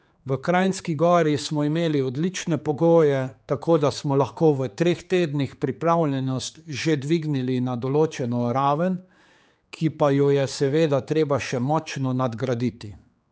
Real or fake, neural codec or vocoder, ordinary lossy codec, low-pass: fake; codec, 16 kHz, 4 kbps, X-Codec, HuBERT features, trained on general audio; none; none